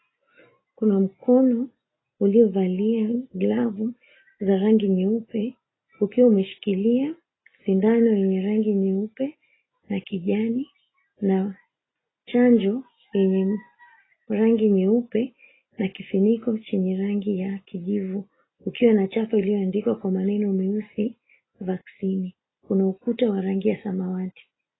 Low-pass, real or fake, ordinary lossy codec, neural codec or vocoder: 7.2 kHz; real; AAC, 16 kbps; none